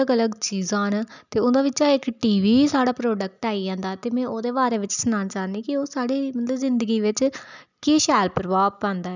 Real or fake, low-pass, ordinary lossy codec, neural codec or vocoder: real; 7.2 kHz; none; none